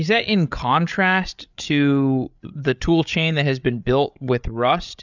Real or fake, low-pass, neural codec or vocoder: fake; 7.2 kHz; codec, 16 kHz, 8 kbps, FreqCodec, larger model